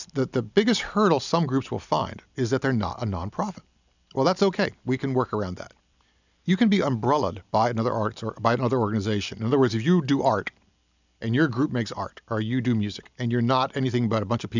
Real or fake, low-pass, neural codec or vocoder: real; 7.2 kHz; none